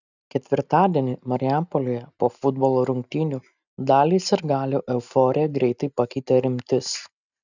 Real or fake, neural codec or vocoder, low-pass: real; none; 7.2 kHz